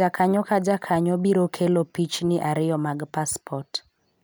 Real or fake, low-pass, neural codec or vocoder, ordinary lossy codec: real; none; none; none